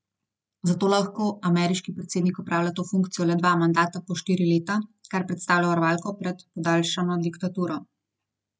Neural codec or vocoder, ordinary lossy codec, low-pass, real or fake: none; none; none; real